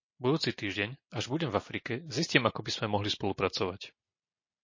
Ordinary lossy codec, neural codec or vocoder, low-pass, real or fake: MP3, 32 kbps; none; 7.2 kHz; real